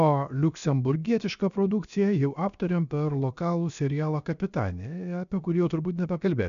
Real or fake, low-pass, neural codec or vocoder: fake; 7.2 kHz; codec, 16 kHz, 0.7 kbps, FocalCodec